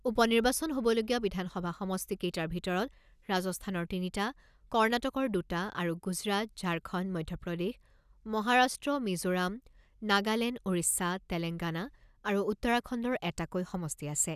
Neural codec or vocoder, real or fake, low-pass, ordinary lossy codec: none; real; 14.4 kHz; none